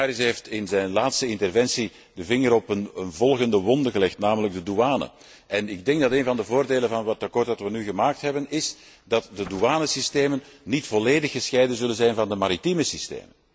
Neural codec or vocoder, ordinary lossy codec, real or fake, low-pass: none; none; real; none